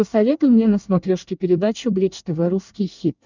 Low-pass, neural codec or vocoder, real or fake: 7.2 kHz; codec, 24 kHz, 1 kbps, SNAC; fake